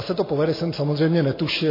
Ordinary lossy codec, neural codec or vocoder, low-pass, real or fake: MP3, 24 kbps; none; 5.4 kHz; real